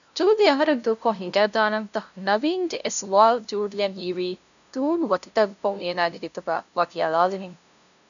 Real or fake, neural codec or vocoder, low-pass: fake; codec, 16 kHz, 0.5 kbps, FunCodec, trained on LibriTTS, 25 frames a second; 7.2 kHz